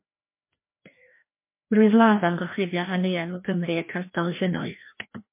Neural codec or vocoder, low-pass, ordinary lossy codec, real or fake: codec, 16 kHz, 1 kbps, FreqCodec, larger model; 3.6 kHz; MP3, 24 kbps; fake